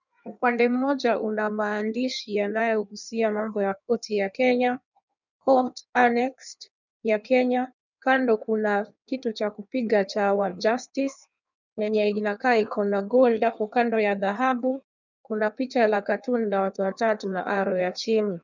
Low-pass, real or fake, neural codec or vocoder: 7.2 kHz; fake; codec, 16 kHz in and 24 kHz out, 1.1 kbps, FireRedTTS-2 codec